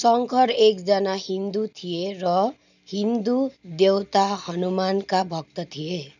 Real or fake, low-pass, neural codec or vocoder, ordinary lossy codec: real; 7.2 kHz; none; none